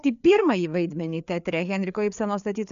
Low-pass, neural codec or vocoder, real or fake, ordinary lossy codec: 7.2 kHz; codec, 16 kHz, 4 kbps, FreqCodec, larger model; fake; AAC, 96 kbps